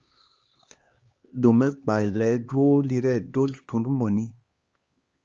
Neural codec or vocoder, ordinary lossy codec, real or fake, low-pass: codec, 16 kHz, 2 kbps, X-Codec, HuBERT features, trained on LibriSpeech; Opus, 32 kbps; fake; 7.2 kHz